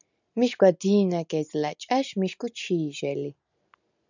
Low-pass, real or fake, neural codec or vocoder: 7.2 kHz; real; none